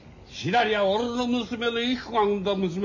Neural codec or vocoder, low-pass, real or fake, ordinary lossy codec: none; 7.2 kHz; real; none